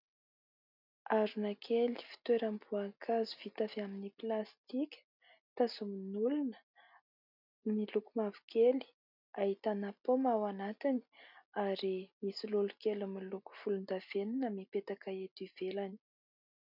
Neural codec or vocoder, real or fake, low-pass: none; real; 5.4 kHz